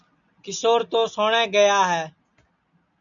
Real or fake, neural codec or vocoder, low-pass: real; none; 7.2 kHz